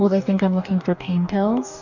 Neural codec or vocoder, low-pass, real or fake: codec, 44.1 kHz, 2.6 kbps, DAC; 7.2 kHz; fake